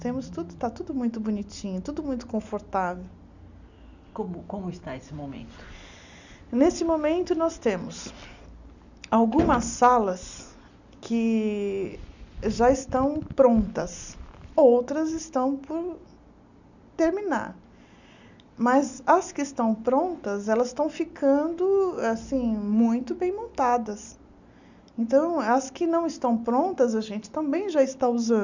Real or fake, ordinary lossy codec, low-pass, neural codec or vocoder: real; none; 7.2 kHz; none